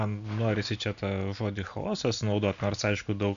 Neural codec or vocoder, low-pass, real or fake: none; 7.2 kHz; real